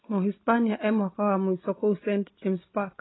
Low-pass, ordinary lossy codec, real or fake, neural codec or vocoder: 7.2 kHz; AAC, 16 kbps; real; none